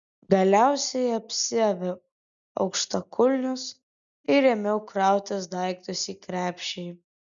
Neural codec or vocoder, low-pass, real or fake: none; 7.2 kHz; real